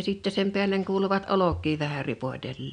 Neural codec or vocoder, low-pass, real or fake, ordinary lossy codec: none; 9.9 kHz; real; none